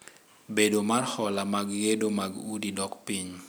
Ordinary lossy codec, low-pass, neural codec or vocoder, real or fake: none; none; none; real